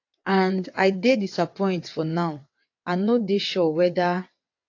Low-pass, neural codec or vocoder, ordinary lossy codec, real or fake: 7.2 kHz; vocoder, 22.05 kHz, 80 mel bands, WaveNeXt; AAC, 48 kbps; fake